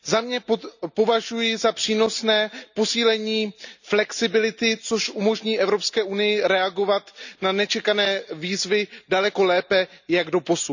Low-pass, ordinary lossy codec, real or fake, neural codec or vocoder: 7.2 kHz; none; real; none